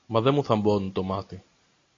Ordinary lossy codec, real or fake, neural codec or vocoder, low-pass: AAC, 32 kbps; real; none; 7.2 kHz